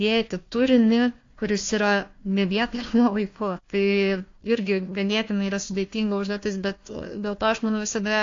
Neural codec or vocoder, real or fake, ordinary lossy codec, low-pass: codec, 16 kHz, 1 kbps, FunCodec, trained on Chinese and English, 50 frames a second; fake; AAC, 48 kbps; 7.2 kHz